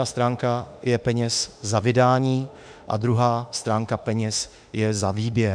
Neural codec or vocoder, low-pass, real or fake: autoencoder, 48 kHz, 32 numbers a frame, DAC-VAE, trained on Japanese speech; 9.9 kHz; fake